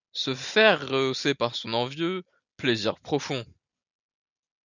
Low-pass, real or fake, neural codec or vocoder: 7.2 kHz; real; none